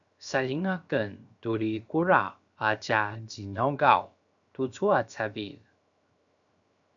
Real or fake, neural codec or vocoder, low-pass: fake; codec, 16 kHz, 0.7 kbps, FocalCodec; 7.2 kHz